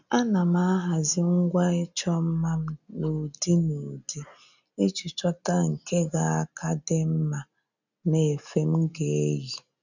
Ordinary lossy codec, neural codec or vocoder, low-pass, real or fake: none; none; 7.2 kHz; real